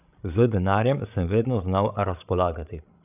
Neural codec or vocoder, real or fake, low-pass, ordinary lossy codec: codec, 16 kHz, 16 kbps, FreqCodec, larger model; fake; 3.6 kHz; none